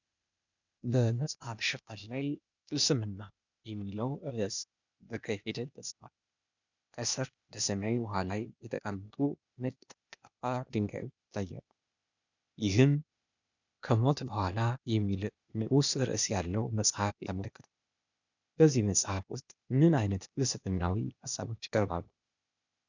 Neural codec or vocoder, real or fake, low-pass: codec, 16 kHz, 0.8 kbps, ZipCodec; fake; 7.2 kHz